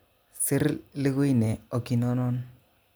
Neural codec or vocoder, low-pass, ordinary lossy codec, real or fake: none; none; none; real